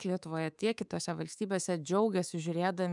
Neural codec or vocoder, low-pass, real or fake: codec, 24 kHz, 3.1 kbps, DualCodec; 10.8 kHz; fake